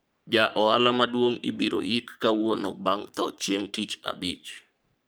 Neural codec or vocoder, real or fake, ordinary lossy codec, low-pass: codec, 44.1 kHz, 3.4 kbps, Pupu-Codec; fake; none; none